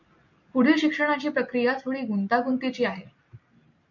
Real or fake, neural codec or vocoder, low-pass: real; none; 7.2 kHz